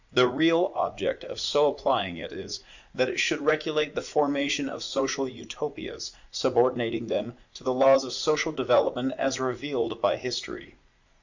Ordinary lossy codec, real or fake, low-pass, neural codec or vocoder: Opus, 64 kbps; fake; 7.2 kHz; vocoder, 44.1 kHz, 80 mel bands, Vocos